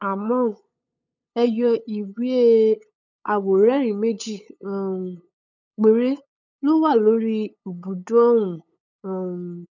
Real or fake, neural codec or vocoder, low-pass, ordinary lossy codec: fake; codec, 16 kHz, 8 kbps, FunCodec, trained on LibriTTS, 25 frames a second; 7.2 kHz; none